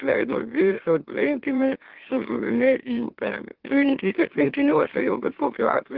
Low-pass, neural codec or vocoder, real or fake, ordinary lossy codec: 5.4 kHz; autoencoder, 44.1 kHz, a latent of 192 numbers a frame, MeloTTS; fake; Opus, 16 kbps